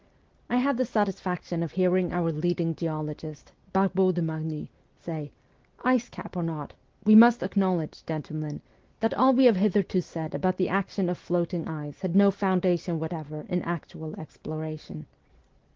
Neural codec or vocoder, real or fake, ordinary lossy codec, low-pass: none; real; Opus, 16 kbps; 7.2 kHz